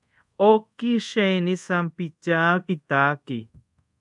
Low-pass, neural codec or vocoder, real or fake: 10.8 kHz; codec, 24 kHz, 0.5 kbps, DualCodec; fake